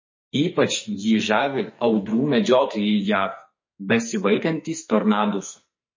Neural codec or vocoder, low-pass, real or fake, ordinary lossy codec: codec, 44.1 kHz, 2.6 kbps, SNAC; 7.2 kHz; fake; MP3, 32 kbps